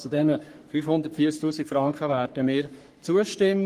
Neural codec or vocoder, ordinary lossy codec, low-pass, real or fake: codec, 44.1 kHz, 3.4 kbps, Pupu-Codec; Opus, 24 kbps; 14.4 kHz; fake